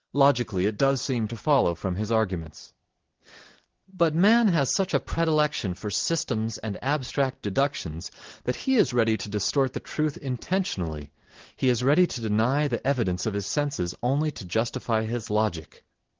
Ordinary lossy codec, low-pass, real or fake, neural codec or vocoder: Opus, 16 kbps; 7.2 kHz; real; none